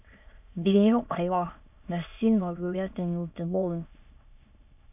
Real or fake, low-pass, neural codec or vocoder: fake; 3.6 kHz; autoencoder, 22.05 kHz, a latent of 192 numbers a frame, VITS, trained on many speakers